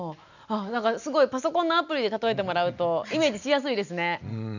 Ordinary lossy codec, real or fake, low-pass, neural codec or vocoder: none; real; 7.2 kHz; none